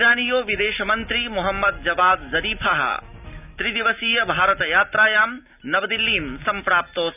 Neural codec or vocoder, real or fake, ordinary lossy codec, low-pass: none; real; none; 3.6 kHz